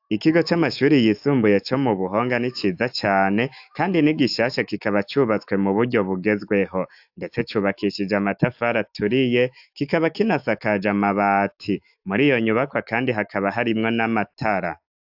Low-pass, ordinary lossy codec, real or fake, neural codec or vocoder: 5.4 kHz; AAC, 48 kbps; fake; autoencoder, 48 kHz, 128 numbers a frame, DAC-VAE, trained on Japanese speech